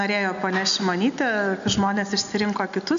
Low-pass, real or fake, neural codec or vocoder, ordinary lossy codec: 7.2 kHz; real; none; MP3, 64 kbps